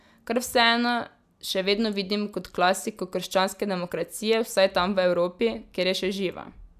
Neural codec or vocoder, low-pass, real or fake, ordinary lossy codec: none; 14.4 kHz; real; none